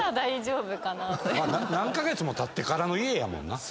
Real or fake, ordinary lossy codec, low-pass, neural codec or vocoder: real; none; none; none